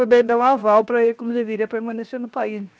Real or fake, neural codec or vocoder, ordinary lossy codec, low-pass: fake; codec, 16 kHz, 0.7 kbps, FocalCodec; none; none